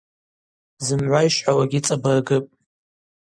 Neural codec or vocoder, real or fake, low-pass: none; real; 9.9 kHz